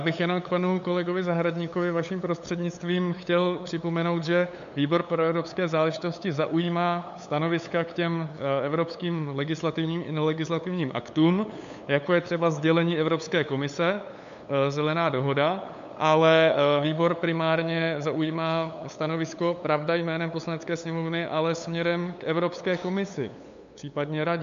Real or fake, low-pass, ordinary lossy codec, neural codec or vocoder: fake; 7.2 kHz; MP3, 64 kbps; codec, 16 kHz, 8 kbps, FunCodec, trained on LibriTTS, 25 frames a second